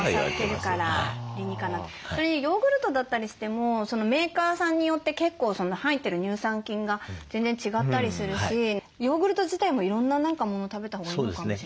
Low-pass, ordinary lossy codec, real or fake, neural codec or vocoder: none; none; real; none